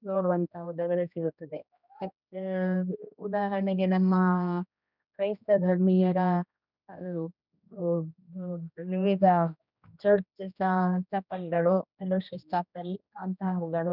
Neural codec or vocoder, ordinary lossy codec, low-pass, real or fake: codec, 16 kHz, 1 kbps, X-Codec, HuBERT features, trained on general audio; none; 5.4 kHz; fake